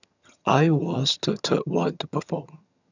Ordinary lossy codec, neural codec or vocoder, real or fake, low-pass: none; vocoder, 22.05 kHz, 80 mel bands, HiFi-GAN; fake; 7.2 kHz